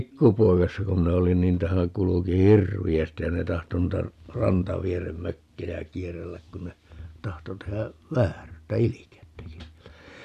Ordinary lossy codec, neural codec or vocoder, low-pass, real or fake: none; none; 14.4 kHz; real